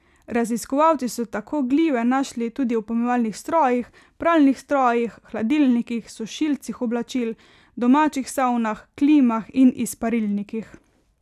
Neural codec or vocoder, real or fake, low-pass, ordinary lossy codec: none; real; 14.4 kHz; none